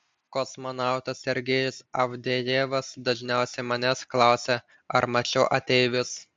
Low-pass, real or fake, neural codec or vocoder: 9.9 kHz; real; none